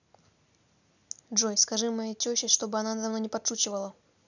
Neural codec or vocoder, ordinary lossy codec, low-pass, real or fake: none; none; 7.2 kHz; real